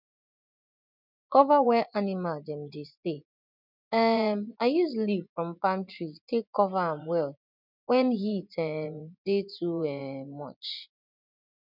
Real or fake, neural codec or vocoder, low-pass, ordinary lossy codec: fake; vocoder, 24 kHz, 100 mel bands, Vocos; 5.4 kHz; none